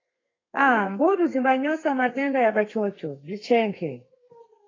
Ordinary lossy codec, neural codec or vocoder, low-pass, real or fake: AAC, 32 kbps; codec, 32 kHz, 1.9 kbps, SNAC; 7.2 kHz; fake